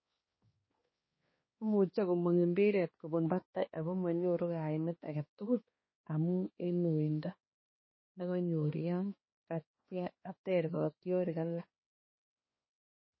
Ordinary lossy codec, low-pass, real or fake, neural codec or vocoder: MP3, 24 kbps; 7.2 kHz; fake; codec, 16 kHz, 2 kbps, X-Codec, HuBERT features, trained on balanced general audio